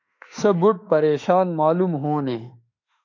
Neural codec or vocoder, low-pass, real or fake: autoencoder, 48 kHz, 32 numbers a frame, DAC-VAE, trained on Japanese speech; 7.2 kHz; fake